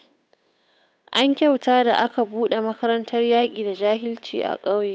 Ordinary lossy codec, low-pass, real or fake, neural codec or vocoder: none; none; fake; codec, 16 kHz, 8 kbps, FunCodec, trained on Chinese and English, 25 frames a second